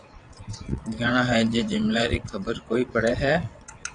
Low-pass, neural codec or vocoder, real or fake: 9.9 kHz; vocoder, 22.05 kHz, 80 mel bands, WaveNeXt; fake